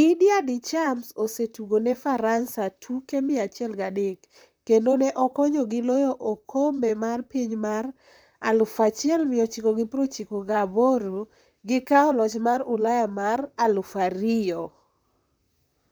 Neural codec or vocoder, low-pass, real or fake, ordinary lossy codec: vocoder, 44.1 kHz, 128 mel bands, Pupu-Vocoder; none; fake; none